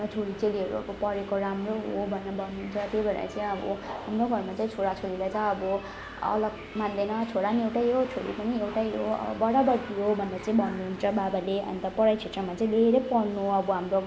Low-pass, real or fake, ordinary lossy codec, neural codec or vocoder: none; real; none; none